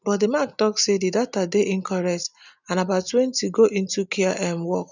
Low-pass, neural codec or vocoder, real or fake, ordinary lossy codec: 7.2 kHz; none; real; none